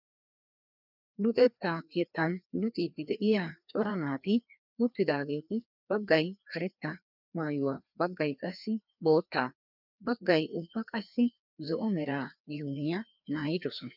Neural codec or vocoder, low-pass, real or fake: codec, 16 kHz, 2 kbps, FreqCodec, larger model; 5.4 kHz; fake